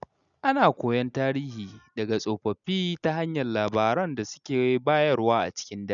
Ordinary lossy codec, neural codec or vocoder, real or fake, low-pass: none; none; real; 7.2 kHz